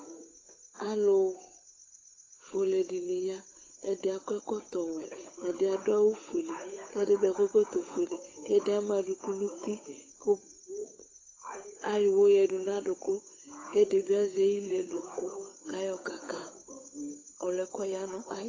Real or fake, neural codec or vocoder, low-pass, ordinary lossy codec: fake; codec, 16 kHz, 8 kbps, FunCodec, trained on Chinese and English, 25 frames a second; 7.2 kHz; AAC, 32 kbps